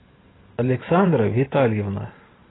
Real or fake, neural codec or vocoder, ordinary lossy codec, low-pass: fake; vocoder, 44.1 kHz, 128 mel bands every 512 samples, BigVGAN v2; AAC, 16 kbps; 7.2 kHz